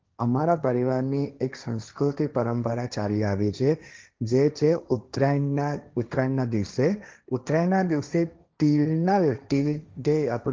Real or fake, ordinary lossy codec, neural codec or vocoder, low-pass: fake; Opus, 32 kbps; codec, 16 kHz, 1.1 kbps, Voila-Tokenizer; 7.2 kHz